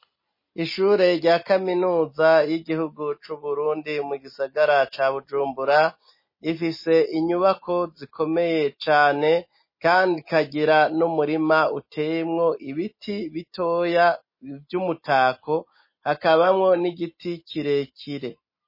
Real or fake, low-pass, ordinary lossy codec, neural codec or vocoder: real; 5.4 kHz; MP3, 24 kbps; none